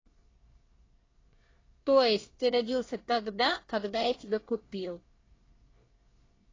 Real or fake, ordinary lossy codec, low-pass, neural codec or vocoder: fake; AAC, 32 kbps; 7.2 kHz; codec, 24 kHz, 1 kbps, SNAC